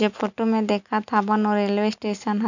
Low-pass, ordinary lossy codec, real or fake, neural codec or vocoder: 7.2 kHz; none; real; none